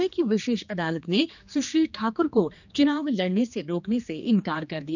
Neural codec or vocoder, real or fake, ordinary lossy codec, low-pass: codec, 16 kHz, 2 kbps, X-Codec, HuBERT features, trained on general audio; fake; none; 7.2 kHz